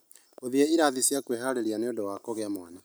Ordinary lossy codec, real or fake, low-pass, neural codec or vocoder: none; real; none; none